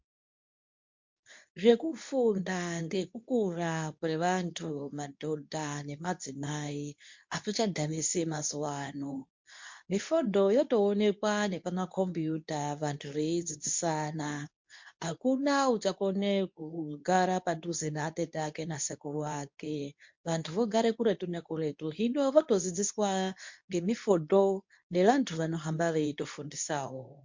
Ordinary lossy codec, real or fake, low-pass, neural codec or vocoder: MP3, 48 kbps; fake; 7.2 kHz; codec, 24 kHz, 0.9 kbps, WavTokenizer, medium speech release version 2